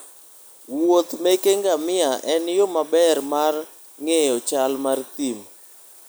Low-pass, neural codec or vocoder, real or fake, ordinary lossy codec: none; none; real; none